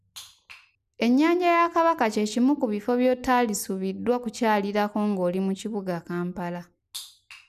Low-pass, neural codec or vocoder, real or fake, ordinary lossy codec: 14.4 kHz; none; real; none